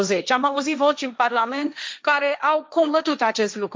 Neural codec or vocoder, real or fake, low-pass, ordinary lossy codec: codec, 16 kHz, 1.1 kbps, Voila-Tokenizer; fake; none; none